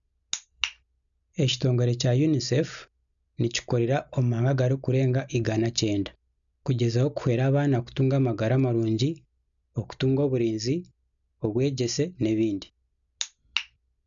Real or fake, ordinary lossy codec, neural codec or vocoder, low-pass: real; none; none; 7.2 kHz